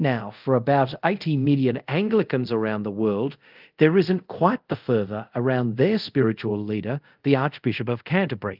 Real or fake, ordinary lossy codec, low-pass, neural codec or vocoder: fake; Opus, 24 kbps; 5.4 kHz; codec, 24 kHz, 0.5 kbps, DualCodec